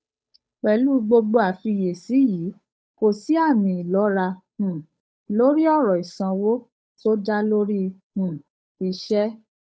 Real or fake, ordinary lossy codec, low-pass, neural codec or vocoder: fake; none; none; codec, 16 kHz, 8 kbps, FunCodec, trained on Chinese and English, 25 frames a second